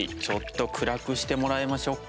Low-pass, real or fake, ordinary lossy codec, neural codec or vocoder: none; real; none; none